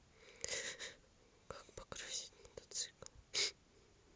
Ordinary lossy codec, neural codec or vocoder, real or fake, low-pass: none; none; real; none